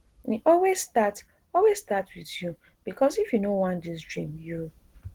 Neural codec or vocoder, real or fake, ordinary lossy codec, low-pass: none; real; Opus, 16 kbps; 19.8 kHz